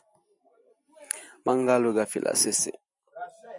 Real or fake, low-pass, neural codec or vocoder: real; 10.8 kHz; none